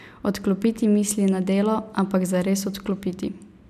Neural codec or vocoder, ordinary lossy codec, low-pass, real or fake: none; none; 14.4 kHz; real